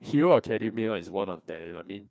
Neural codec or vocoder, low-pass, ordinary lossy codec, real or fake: codec, 16 kHz, 2 kbps, FreqCodec, larger model; none; none; fake